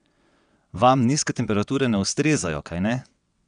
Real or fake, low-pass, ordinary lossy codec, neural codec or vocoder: fake; 9.9 kHz; none; vocoder, 22.05 kHz, 80 mel bands, WaveNeXt